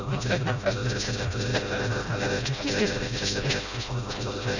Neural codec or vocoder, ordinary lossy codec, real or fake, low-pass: codec, 16 kHz, 0.5 kbps, FreqCodec, smaller model; none; fake; 7.2 kHz